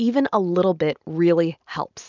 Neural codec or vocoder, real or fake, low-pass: none; real; 7.2 kHz